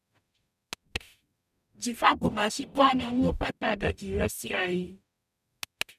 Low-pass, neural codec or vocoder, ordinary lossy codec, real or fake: 14.4 kHz; codec, 44.1 kHz, 0.9 kbps, DAC; none; fake